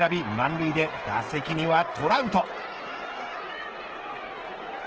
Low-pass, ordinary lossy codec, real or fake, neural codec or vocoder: 7.2 kHz; Opus, 16 kbps; fake; codec, 16 kHz, 16 kbps, FreqCodec, smaller model